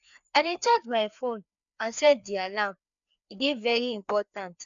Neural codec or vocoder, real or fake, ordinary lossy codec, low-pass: codec, 16 kHz, 2 kbps, FreqCodec, larger model; fake; none; 7.2 kHz